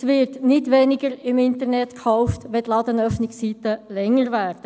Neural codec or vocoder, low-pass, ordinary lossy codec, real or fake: none; none; none; real